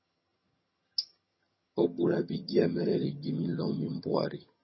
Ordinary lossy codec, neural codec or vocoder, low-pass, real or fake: MP3, 24 kbps; vocoder, 22.05 kHz, 80 mel bands, HiFi-GAN; 7.2 kHz; fake